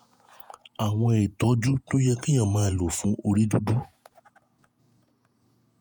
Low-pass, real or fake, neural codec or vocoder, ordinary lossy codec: none; fake; vocoder, 48 kHz, 128 mel bands, Vocos; none